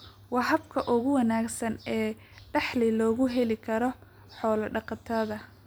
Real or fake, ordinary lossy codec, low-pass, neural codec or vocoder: real; none; none; none